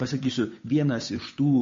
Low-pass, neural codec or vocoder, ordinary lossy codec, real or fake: 7.2 kHz; codec, 16 kHz, 8 kbps, FunCodec, trained on Chinese and English, 25 frames a second; MP3, 32 kbps; fake